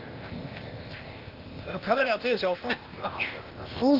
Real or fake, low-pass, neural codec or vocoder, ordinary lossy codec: fake; 5.4 kHz; codec, 16 kHz, 0.8 kbps, ZipCodec; Opus, 32 kbps